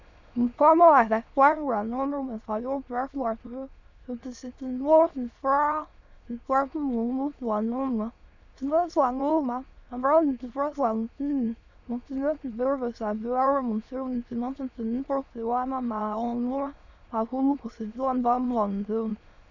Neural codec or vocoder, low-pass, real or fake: autoencoder, 22.05 kHz, a latent of 192 numbers a frame, VITS, trained on many speakers; 7.2 kHz; fake